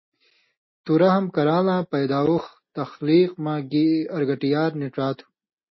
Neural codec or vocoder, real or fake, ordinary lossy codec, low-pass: none; real; MP3, 24 kbps; 7.2 kHz